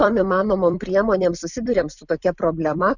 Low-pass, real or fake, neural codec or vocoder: 7.2 kHz; fake; vocoder, 44.1 kHz, 128 mel bands every 256 samples, BigVGAN v2